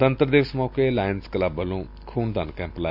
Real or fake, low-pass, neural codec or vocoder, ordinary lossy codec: real; 5.4 kHz; none; none